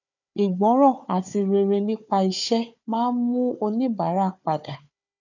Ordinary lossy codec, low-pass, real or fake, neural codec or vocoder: none; 7.2 kHz; fake; codec, 16 kHz, 4 kbps, FunCodec, trained on Chinese and English, 50 frames a second